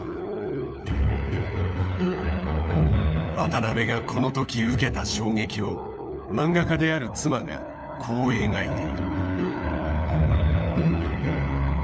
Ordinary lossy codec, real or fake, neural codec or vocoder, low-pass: none; fake; codec, 16 kHz, 4 kbps, FunCodec, trained on LibriTTS, 50 frames a second; none